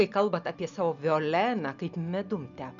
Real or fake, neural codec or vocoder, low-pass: real; none; 7.2 kHz